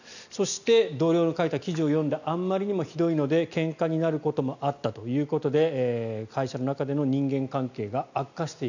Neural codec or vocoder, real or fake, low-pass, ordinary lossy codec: none; real; 7.2 kHz; none